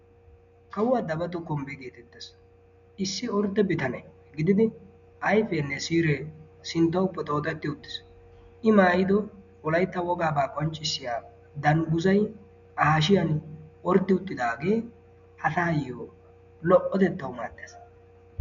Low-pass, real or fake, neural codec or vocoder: 7.2 kHz; real; none